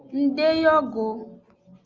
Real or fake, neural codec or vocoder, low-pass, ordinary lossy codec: real; none; 7.2 kHz; Opus, 32 kbps